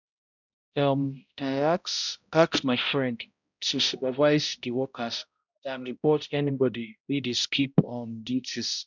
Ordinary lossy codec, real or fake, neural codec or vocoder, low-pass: none; fake; codec, 16 kHz, 0.5 kbps, X-Codec, HuBERT features, trained on balanced general audio; 7.2 kHz